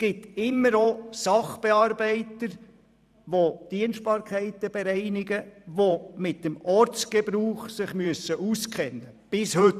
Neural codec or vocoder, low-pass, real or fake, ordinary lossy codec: vocoder, 44.1 kHz, 128 mel bands every 512 samples, BigVGAN v2; 14.4 kHz; fake; none